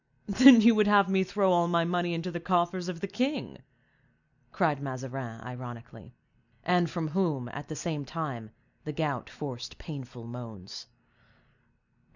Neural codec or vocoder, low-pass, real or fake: none; 7.2 kHz; real